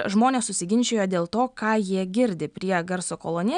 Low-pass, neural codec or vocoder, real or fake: 9.9 kHz; none; real